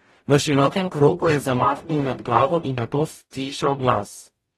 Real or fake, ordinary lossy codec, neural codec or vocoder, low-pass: fake; AAC, 32 kbps; codec, 44.1 kHz, 0.9 kbps, DAC; 19.8 kHz